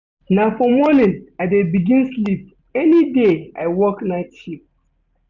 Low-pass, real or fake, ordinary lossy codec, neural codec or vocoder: 7.2 kHz; real; none; none